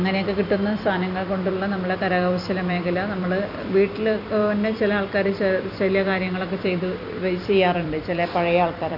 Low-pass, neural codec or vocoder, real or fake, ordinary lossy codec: 5.4 kHz; vocoder, 44.1 kHz, 128 mel bands every 256 samples, BigVGAN v2; fake; none